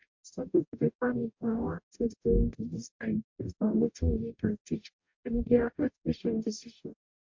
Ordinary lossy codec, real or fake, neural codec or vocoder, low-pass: MP3, 48 kbps; fake; codec, 44.1 kHz, 0.9 kbps, DAC; 7.2 kHz